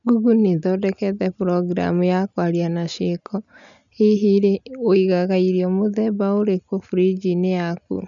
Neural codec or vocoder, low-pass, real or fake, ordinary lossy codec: none; 7.2 kHz; real; none